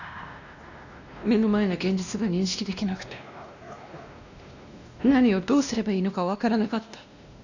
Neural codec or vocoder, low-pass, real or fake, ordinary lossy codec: codec, 16 kHz, 1 kbps, X-Codec, WavLM features, trained on Multilingual LibriSpeech; 7.2 kHz; fake; none